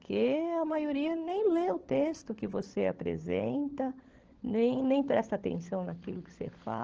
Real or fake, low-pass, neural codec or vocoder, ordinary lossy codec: fake; 7.2 kHz; codec, 16 kHz, 16 kbps, FunCodec, trained on LibriTTS, 50 frames a second; Opus, 16 kbps